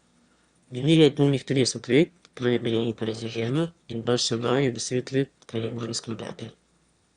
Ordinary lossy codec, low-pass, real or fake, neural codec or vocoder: Opus, 64 kbps; 9.9 kHz; fake; autoencoder, 22.05 kHz, a latent of 192 numbers a frame, VITS, trained on one speaker